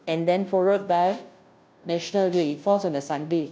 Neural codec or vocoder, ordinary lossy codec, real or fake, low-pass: codec, 16 kHz, 0.5 kbps, FunCodec, trained on Chinese and English, 25 frames a second; none; fake; none